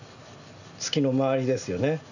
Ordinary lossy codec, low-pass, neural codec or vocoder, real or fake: none; 7.2 kHz; none; real